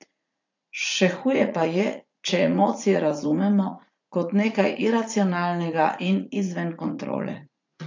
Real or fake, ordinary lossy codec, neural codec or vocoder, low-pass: fake; AAC, 48 kbps; vocoder, 44.1 kHz, 80 mel bands, Vocos; 7.2 kHz